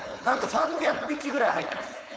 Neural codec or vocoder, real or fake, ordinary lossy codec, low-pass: codec, 16 kHz, 4.8 kbps, FACodec; fake; none; none